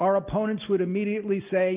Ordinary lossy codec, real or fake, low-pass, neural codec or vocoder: Opus, 24 kbps; real; 3.6 kHz; none